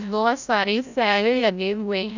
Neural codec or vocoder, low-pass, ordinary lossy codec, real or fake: codec, 16 kHz, 0.5 kbps, FreqCodec, larger model; 7.2 kHz; none; fake